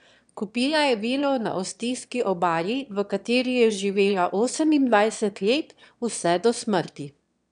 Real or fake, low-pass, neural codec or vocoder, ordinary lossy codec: fake; 9.9 kHz; autoencoder, 22.05 kHz, a latent of 192 numbers a frame, VITS, trained on one speaker; none